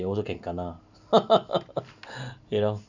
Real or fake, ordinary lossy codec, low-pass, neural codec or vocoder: real; none; 7.2 kHz; none